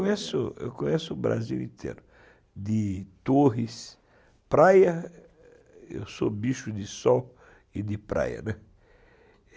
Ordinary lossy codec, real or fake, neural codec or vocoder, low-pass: none; real; none; none